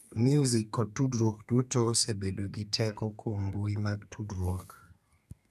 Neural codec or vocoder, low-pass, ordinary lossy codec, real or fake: codec, 32 kHz, 1.9 kbps, SNAC; 14.4 kHz; none; fake